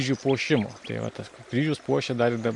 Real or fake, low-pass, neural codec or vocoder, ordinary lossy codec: real; 10.8 kHz; none; MP3, 48 kbps